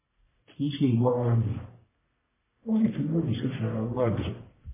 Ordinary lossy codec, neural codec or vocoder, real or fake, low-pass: MP3, 16 kbps; codec, 44.1 kHz, 1.7 kbps, Pupu-Codec; fake; 3.6 kHz